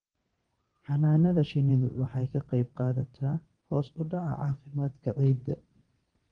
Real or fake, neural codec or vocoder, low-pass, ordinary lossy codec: fake; vocoder, 22.05 kHz, 80 mel bands, WaveNeXt; 9.9 kHz; Opus, 24 kbps